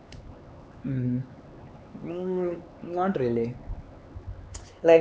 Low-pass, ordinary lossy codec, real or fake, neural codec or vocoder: none; none; fake; codec, 16 kHz, 4 kbps, X-Codec, HuBERT features, trained on LibriSpeech